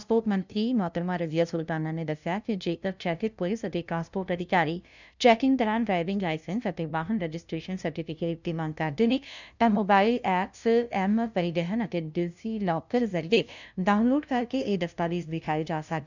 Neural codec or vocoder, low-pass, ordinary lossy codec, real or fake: codec, 16 kHz, 0.5 kbps, FunCodec, trained on LibriTTS, 25 frames a second; 7.2 kHz; none; fake